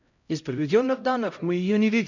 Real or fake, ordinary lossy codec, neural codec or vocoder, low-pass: fake; none; codec, 16 kHz, 0.5 kbps, X-Codec, HuBERT features, trained on LibriSpeech; 7.2 kHz